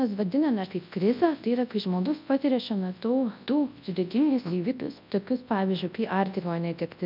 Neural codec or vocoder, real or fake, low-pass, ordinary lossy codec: codec, 24 kHz, 0.9 kbps, WavTokenizer, large speech release; fake; 5.4 kHz; MP3, 48 kbps